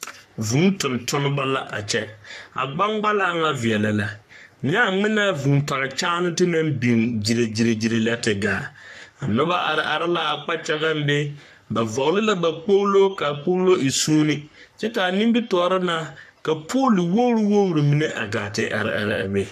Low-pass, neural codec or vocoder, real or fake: 14.4 kHz; codec, 44.1 kHz, 3.4 kbps, Pupu-Codec; fake